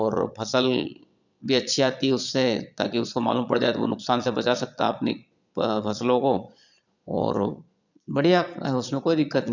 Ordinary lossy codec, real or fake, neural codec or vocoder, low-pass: none; fake; vocoder, 22.05 kHz, 80 mel bands, Vocos; 7.2 kHz